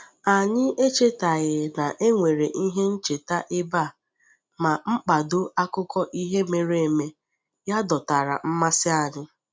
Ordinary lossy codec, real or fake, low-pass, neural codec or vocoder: none; real; none; none